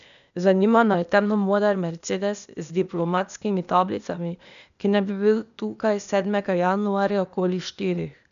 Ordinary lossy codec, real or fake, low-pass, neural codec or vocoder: none; fake; 7.2 kHz; codec, 16 kHz, 0.8 kbps, ZipCodec